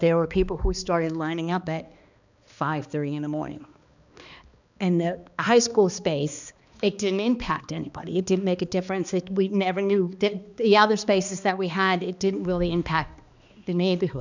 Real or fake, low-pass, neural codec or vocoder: fake; 7.2 kHz; codec, 16 kHz, 2 kbps, X-Codec, HuBERT features, trained on balanced general audio